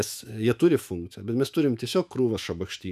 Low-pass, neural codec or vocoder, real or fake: 14.4 kHz; autoencoder, 48 kHz, 128 numbers a frame, DAC-VAE, trained on Japanese speech; fake